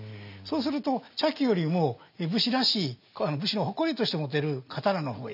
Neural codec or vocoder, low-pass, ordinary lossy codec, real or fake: none; 5.4 kHz; none; real